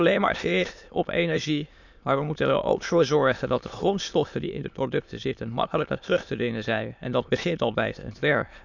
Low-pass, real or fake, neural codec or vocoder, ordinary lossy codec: 7.2 kHz; fake; autoencoder, 22.05 kHz, a latent of 192 numbers a frame, VITS, trained on many speakers; none